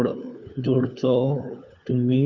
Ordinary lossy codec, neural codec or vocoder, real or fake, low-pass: Opus, 64 kbps; codec, 16 kHz, 4 kbps, FunCodec, trained on LibriTTS, 50 frames a second; fake; 7.2 kHz